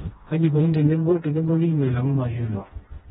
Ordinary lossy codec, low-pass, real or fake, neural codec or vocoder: AAC, 16 kbps; 7.2 kHz; fake; codec, 16 kHz, 1 kbps, FreqCodec, smaller model